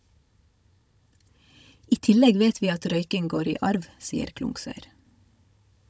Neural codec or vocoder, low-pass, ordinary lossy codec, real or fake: codec, 16 kHz, 16 kbps, FunCodec, trained on Chinese and English, 50 frames a second; none; none; fake